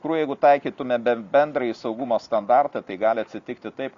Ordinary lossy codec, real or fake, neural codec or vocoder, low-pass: Opus, 64 kbps; real; none; 7.2 kHz